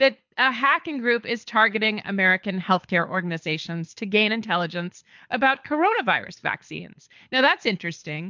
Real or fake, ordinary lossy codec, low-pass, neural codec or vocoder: fake; MP3, 64 kbps; 7.2 kHz; codec, 24 kHz, 6 kbps, HILCodec